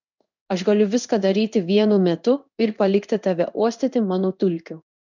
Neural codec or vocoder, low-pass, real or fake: codec, 16 kHz in and 24 kHz out, 1 kbps, XY-Tokenizer; 7.2 kHz; fake